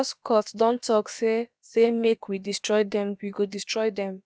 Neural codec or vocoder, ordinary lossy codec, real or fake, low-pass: codec, 16 kHz, about 1 kbps, DyCAST, with the encoder's durations; none; fake; none